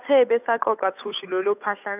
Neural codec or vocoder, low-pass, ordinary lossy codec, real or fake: codec, 16 kHz, 8 kbps, FunCodec, trained on Chinese and English, 25 frames a second; 3.6 kHz; none; fake